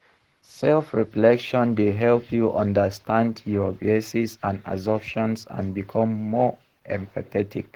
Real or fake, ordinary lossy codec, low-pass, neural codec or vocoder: fake; Opus, 16 kbps; 19.8 kHz; codec, 44.1 kHz, 7.8 kbps, Pupu-Codec